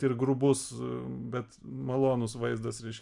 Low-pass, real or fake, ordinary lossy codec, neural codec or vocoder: 10.8 kHz; real; AAC, 64 kbps; none